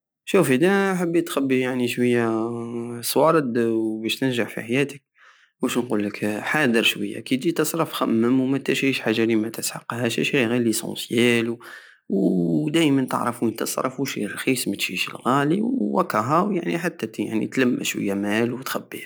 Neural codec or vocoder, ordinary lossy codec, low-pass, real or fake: none; none; none; real